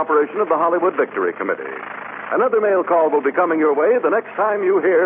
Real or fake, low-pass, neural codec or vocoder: real; 3.6 kHz; none